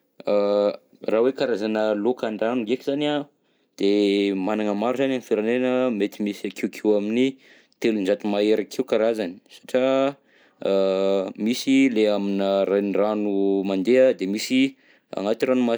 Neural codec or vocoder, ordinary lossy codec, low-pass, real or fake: none; none; none; real